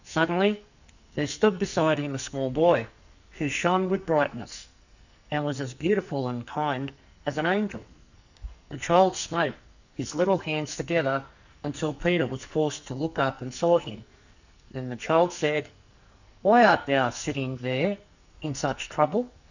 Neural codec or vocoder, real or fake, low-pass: codec, 44.1 kHz, 2.6 kbps, SNAC; fake; 7.2 kHz